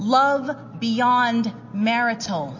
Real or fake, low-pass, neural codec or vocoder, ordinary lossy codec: real; 7.2 kHz; none; MP3, 32 kbps